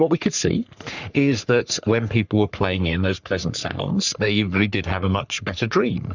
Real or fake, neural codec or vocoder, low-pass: fake; codec, 44.1 kHz, 3.4 kbps, Pupu-Codec; 7.2 kHz